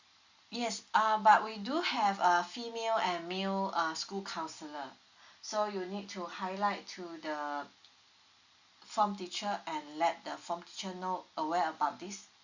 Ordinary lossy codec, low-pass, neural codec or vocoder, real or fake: Opus, 64 kbps; 7.2 kHz; none; real